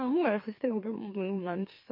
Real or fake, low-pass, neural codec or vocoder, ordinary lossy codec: fake; 5.4 kHz; autoencoder, 44.1 kHz, a latent of 192 numbers a frame, MeloTTS; MP3, 32 kbps